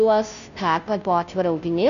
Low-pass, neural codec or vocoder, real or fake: 7.2 kHz; codec, 16 kHz, 0.5 kbps, FunCodec, trained on Chinese and English, 25 frames a second; fake